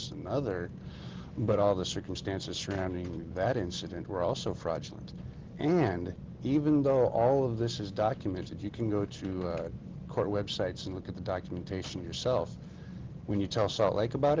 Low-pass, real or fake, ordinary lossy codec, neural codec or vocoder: 7.2 kHz; real; Opus, 16 kbps; none